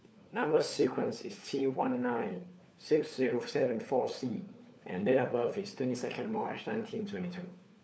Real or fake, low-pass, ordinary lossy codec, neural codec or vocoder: fake; none; none; codec, 16 kHz, 4 kbps, FunCodec, trained on LibriTTS, 50 frames a second